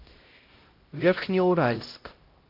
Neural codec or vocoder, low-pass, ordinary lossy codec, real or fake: codec, 16 kHz, 0.5 kbps, X-Codec, HuBERT features, trained on LibriSpeech; 5.4 kHz; Opus, 24 kbps; fake